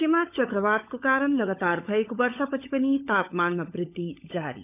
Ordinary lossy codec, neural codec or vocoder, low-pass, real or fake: none; codec, 16 kHz, 16 kbps, FunCodec, trained on LibriTTS, 50 frames a second; 3.6 kHz; fake